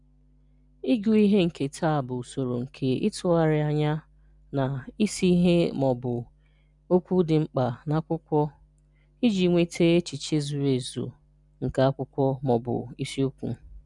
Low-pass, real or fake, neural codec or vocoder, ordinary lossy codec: 10.8 kHz; real; none; none